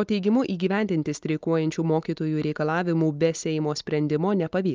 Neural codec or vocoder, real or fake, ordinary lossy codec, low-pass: none; real; Opus, 24 kbps; 7.2 kHz